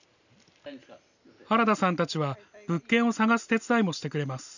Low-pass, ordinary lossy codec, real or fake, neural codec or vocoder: 7.2 kHz; none; real; none